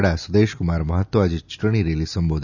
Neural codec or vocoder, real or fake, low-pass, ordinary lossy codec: none; real; 7.2 kHz; none